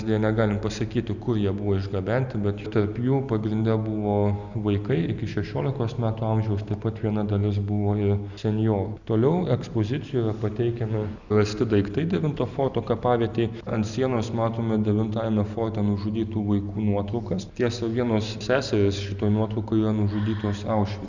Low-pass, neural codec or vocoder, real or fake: 7.2 kHz; none; real